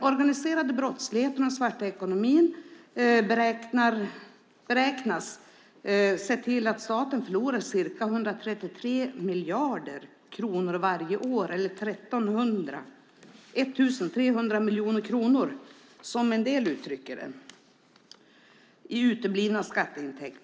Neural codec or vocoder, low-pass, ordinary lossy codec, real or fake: none; none; none; real